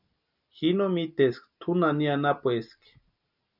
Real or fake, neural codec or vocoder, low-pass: real; none; 5.4 kHz